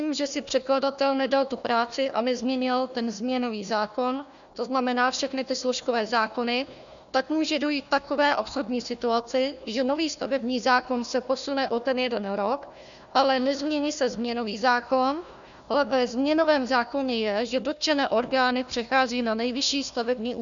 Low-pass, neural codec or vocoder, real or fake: 7.2 kHz; codec, 16 kHz, 1 kbps, FunCodec, trained on Chinese and English, 50 frames a second; fake